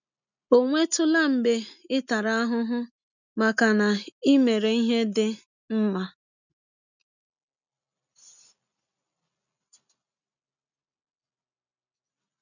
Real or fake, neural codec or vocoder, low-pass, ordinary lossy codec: real; none; 7.2 kHz; none